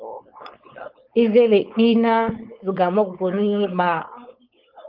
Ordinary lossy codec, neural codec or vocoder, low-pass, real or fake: Opus, 24 kbps; codec, 16 kHz, 4.8 kbps, FACodec; 5.4 kHz; fake